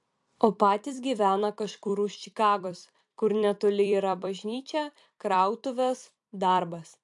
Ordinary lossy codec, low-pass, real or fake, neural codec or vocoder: AAC, 64 kbps; 10.8 kHz; fake; vocoder, 44.1 kHz, 128 mel bands every 256 samples, BigVGAN v2